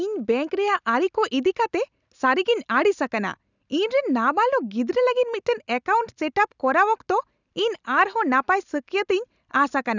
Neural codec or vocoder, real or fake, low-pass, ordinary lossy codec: none; real; 7.2 kHz; none